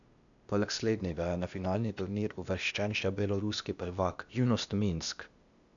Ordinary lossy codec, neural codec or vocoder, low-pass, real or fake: none; codec, 16 kHz, 0.8 kbps, ZipCodec; 7.2 kHz; fake